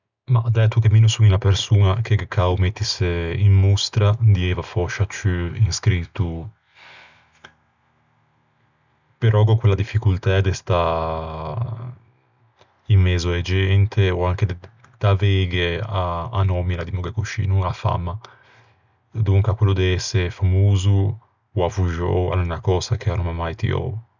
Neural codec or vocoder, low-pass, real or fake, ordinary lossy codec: none; 7.2 kHz; real; none